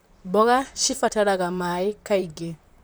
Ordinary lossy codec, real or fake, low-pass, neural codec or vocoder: none; fake; none; vocoder, 44.1 kHz, 128 mel bands, Pupu-Vocoder